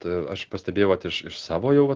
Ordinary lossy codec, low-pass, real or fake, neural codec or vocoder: Opus, 16 kbps; 7.2 kHz; real; none